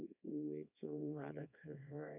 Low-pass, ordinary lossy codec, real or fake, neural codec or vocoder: 3.6 kHz; none; fake; codec, 24 kHz, 0.9 kbps, WavTokenizer, small release